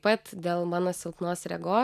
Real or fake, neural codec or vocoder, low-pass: real; none; 14.4 kHz